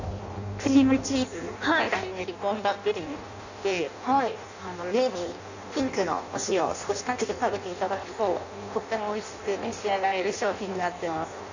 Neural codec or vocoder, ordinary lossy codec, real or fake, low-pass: codec, 16 kHz in and 24 kHz out, 0.6 kbps, FireRedTTS-2 codec; none; fake; 7.2 kHz